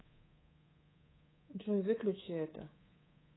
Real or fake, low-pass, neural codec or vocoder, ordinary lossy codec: fake; 7.2 kHz; codec, 24 kHz, 3.1 kbps, DualCodec; AAC, 16 kbps